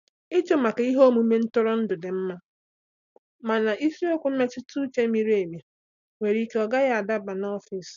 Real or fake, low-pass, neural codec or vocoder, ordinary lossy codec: real; 7.2 kHz; none; none